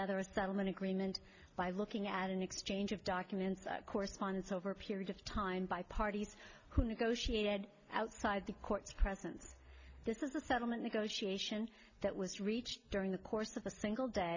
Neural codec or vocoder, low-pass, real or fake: none; 7.2 kHz; real